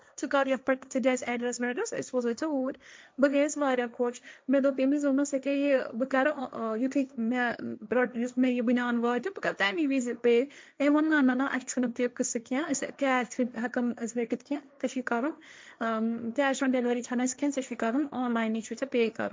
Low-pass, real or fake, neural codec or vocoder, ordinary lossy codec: none; fake; codec, 16 kHz, 1.1 kbps, Voila-Tokenizer; none